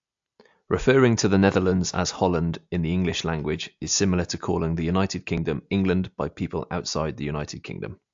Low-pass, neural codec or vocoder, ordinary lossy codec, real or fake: 7.2 kHz; none; AAC, 64 kbps; real